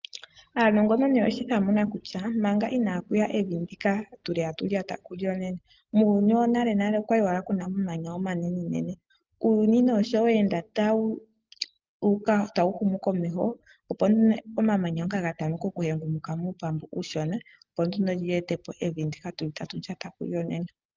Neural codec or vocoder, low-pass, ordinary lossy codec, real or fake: none; 7.2 kHz; Opus, 32 kbps; real